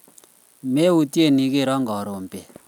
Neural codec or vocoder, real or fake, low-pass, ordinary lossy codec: none; real; 19.8 kHz; none